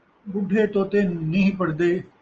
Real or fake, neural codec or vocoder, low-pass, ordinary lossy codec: real; none; 7.2 kHz; Opus, 24 kbps